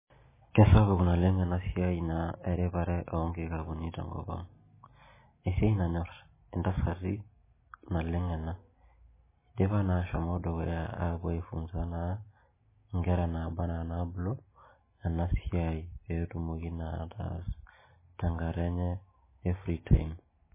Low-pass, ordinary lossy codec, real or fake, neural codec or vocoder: 3.6 kHz; MP3, 16 kbps; real; none